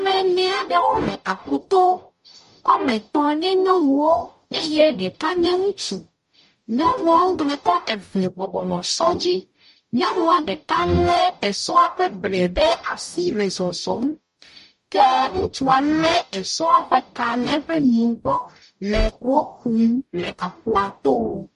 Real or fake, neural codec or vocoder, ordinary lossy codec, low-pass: fake; codec, 44.1 kHz, 0.9 kbps, DAC; MP3, 48 kbps; 14.4 kHz